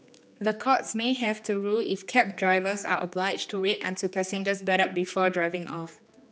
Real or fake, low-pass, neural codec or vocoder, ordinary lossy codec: fake; none; codec, 16 kHz, 2 kbps, X-Codec, HuBERT features, trained on general audio; none